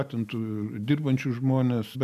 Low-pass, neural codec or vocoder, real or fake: 14.4 kHz; none; real